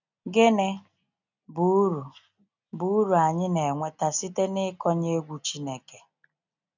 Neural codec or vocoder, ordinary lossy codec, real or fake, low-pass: none; none; real; 7.2 kHz